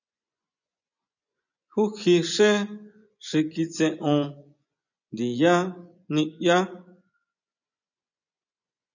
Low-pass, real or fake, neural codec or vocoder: 7.2 kHz; fake; vocoder, 24 kHz, 100 mel bands, Vocos